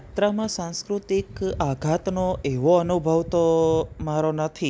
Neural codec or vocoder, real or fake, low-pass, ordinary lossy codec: none; real; none; none